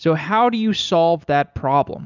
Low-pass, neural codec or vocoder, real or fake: 7.2 kHz; none; real